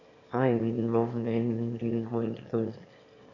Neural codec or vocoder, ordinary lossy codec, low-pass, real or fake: autoencoder, 22.05 kHz, a latent of 192 numbers a frame, VITS, trained on one speaker; none; 7.2 kHz; fake